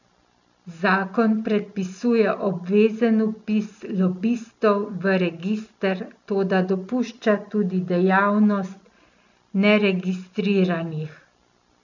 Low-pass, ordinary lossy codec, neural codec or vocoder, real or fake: 7.2 kHz; none; none; real